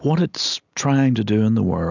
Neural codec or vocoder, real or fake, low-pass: none; real; 7.2 kHz